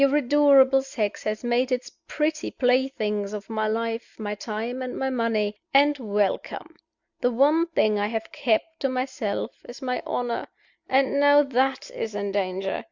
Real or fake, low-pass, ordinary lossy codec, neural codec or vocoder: real; 7.2 kHz; Opus, 64 kbps; none